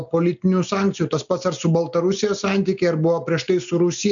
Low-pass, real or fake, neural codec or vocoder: 7.2 kHz; real; none